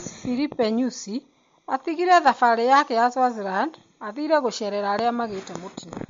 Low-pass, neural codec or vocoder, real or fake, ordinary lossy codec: 7.2 kHz; none; real; MP3, 48 kbps